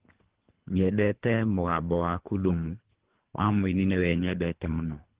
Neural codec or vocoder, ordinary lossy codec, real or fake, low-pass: codec, 24 kHz, 3 kbps, HILCodec; Opus, 16 kbps; fake; 3.6 kHz